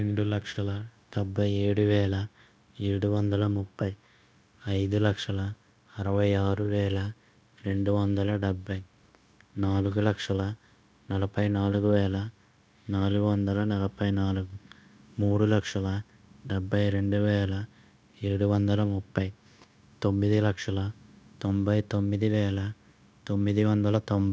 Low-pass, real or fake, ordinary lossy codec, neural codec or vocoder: none; fake; none; codec, 16 kHz, 0.9 kbps, LongCat-Audio-Codec